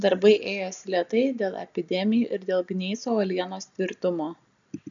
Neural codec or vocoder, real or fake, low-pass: none; real; 7.2 kHz